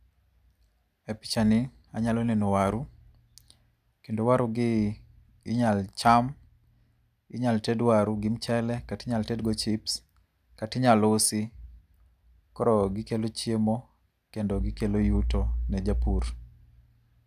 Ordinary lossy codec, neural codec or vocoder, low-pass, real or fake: none; none; 14.4 kHz; real